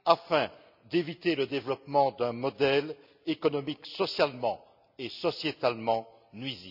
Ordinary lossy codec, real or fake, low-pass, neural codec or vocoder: none; real; 5.4 kHz; none